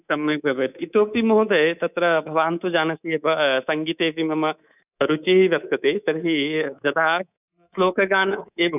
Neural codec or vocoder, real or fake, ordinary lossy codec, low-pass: codec, 24 kHz, 3.1 kbps, DualCodec; fake; none; 3.6 kHz